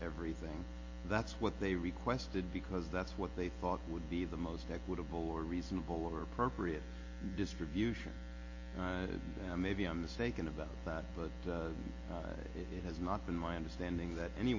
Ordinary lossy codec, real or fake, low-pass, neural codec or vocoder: MP3, 32 kbps; real; 7.2 kHz; none